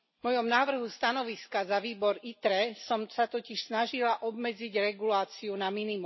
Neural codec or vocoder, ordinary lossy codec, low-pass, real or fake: none; MP3, 24 kbps; 7.2 kHz; real